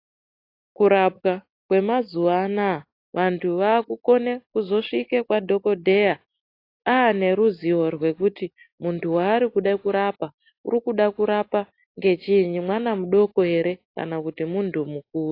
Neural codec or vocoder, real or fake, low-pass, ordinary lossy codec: none; real; 5.4 kHz; AAC, 32 kbps